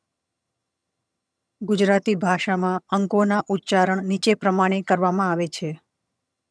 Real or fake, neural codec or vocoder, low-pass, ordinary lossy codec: fake; vocoder, 22.05 kHz, 80 mel bands, HiFi-GAN; none; none